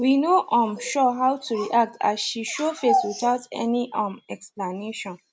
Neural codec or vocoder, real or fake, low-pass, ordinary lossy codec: none; real; none; none